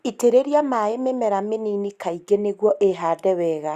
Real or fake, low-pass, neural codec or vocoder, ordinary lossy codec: real; 14.4 kHz; none; Opus, 64 kbps